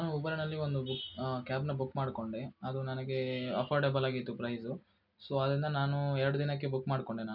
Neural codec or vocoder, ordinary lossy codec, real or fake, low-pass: none; none; real; 5.4 kHz